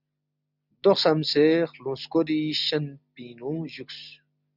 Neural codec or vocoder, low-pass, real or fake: none; 5.4 kHz; real